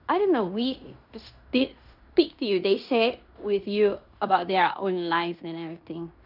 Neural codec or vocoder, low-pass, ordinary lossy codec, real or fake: codec, 16 kHz in and 24 kHz out, 0.9 kbps, LongCat-Audio-Codec, fine tuned four codebook decoder; 5.4 kHz; none; fake